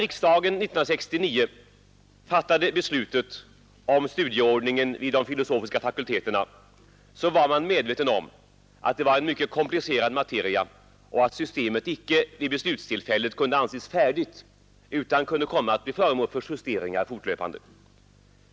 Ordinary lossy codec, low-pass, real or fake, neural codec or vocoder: none; none; real; none